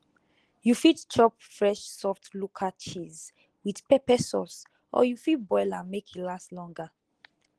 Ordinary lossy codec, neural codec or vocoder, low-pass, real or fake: Opus, 16 kbps; none; 10.8 kHz; real